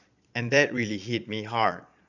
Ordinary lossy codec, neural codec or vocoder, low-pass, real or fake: none; vocoder, 22.05 kHz, 80 mel bands, Vocos; 7.2 kHz; fake